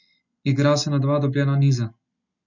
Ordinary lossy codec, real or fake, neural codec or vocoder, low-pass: none; real; none; 7.2 kHz